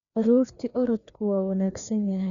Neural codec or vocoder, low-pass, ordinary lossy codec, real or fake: codec, 16 kHz, 2 kbps, FreqCodec, larger model; 7.2 kHz; none; fake